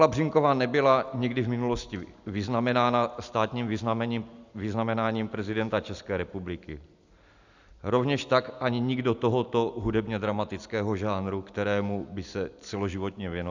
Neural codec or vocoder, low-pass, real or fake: none; 7.2 kHz; real